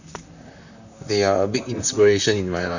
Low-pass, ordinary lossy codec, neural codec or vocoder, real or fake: 7.2 kHz; none; vocoder, 44.1 kHz, 128 mel bands, Pupu-Vocoder; fake